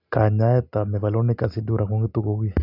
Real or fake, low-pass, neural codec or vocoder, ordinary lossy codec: real; 5.4 kHz; none; none